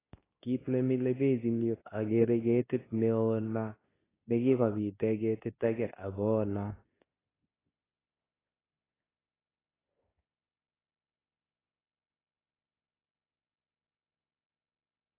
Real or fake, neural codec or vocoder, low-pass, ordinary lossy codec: fake; codec, 24 kHz, 0.9 kbps, WavTokenizer, medium speech release version 2; 3.6 kHz; AAC, 16 kbps